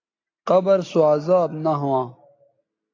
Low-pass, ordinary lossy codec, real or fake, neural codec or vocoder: 7.2 kHz; AAC, 32 kbps; real; none